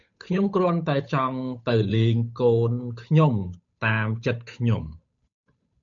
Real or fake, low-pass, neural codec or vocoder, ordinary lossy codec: fake; 7.2 kHz; codec, 16 kHz, 8 kbps, FunCodec, trained on Chinese and English, 25 frames a second; MP3, 96 kbps